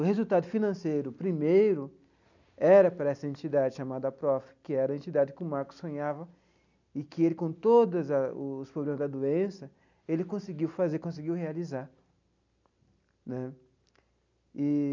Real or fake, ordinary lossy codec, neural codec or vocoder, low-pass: real; none; none; 7.2 kHz